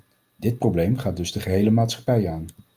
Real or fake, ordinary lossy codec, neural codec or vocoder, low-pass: real; Opus, 32 kbps; none; 14.4 kHz